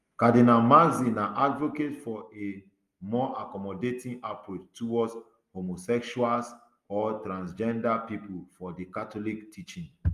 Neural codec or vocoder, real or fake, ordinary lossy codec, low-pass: none; real; Opus, 32 kbps; 14.4 kHz